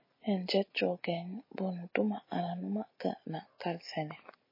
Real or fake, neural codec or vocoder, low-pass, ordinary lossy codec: real; none; 5.4 kHz; MP3, 24 kbps